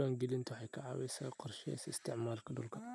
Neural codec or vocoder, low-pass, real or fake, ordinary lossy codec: none; none; real; none